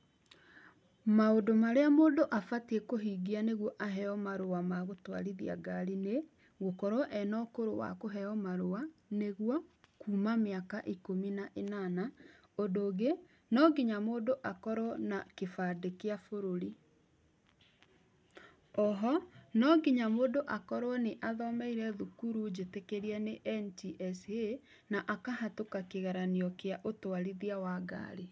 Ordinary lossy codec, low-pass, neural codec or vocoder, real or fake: none; none; none; real